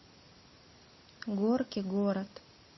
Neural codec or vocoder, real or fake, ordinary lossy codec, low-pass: none; real; MP3, 24 kbps; 7.2 kHz